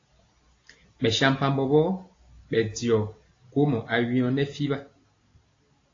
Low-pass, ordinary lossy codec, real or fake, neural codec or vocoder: 7.2 kHz; AAC, 32 kbps; real; none